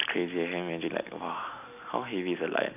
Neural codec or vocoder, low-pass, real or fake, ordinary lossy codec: none; 3.6 kHz; real; none